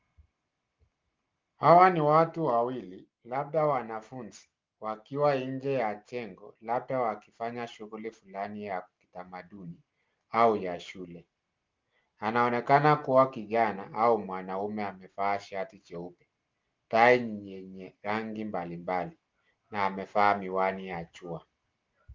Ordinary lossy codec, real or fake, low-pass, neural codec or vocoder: Opus, 32 kbps; real; 7.2 kHz; none